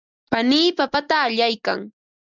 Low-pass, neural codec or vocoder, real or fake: 7.2 kHz; none; real